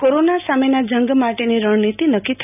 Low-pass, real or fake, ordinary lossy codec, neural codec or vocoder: 3.6 kHz; real; none; none